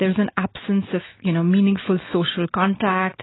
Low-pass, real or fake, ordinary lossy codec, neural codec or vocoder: 7.2 kHz; real; AAC, 16 kbps; none